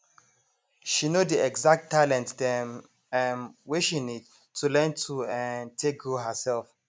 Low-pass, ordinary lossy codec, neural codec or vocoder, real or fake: none; none; none; real